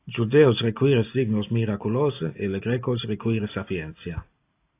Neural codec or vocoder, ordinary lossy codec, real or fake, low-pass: none; AAC, 24 kbps; real; 3.6 kHz